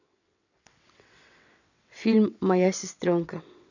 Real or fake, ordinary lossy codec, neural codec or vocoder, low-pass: fake; none; vocoder, 22.05 kHz, 80 mel bands, WaveNeXt; 7.2 kHz